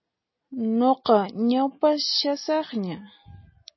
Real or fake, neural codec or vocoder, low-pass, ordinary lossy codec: real; none; 7.2 kHz; MP3, 24 kbps